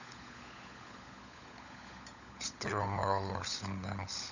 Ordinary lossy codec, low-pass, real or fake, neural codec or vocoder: none; 7.2 kHz; fake; codec, 16 kHz, 16 kbps, FunCodec, trained on LibriTTS, 50 frames a second